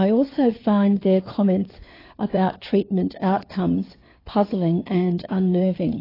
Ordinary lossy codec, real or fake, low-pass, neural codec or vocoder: AAC, 24 kbps; fake; 5.4 kHz; codec, 16 kHz, 4 kbps, FunCodec, trained on LibriTTS, 50 frames a second